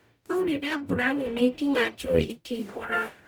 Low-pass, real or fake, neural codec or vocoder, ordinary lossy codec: none; fake; codec, 44.1 kHz, 0.9 kbps, DAC; none